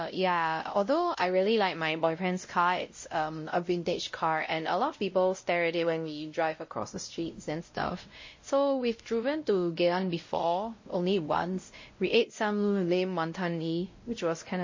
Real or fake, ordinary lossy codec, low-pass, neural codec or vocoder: fake; MP3, 32 kbps; 7.2 kHz; codec, 16 kHz, 0.5 kbps, X-Codec, WavLM features, trained on Multilingual LibriSpeech